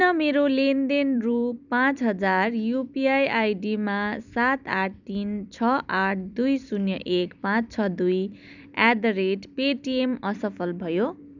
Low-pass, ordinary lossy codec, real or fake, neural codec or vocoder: 7.2 kHz; none; fake; vocoder, 44.1 kHz, 128 mel bands every 256 samples, BigVGAN v2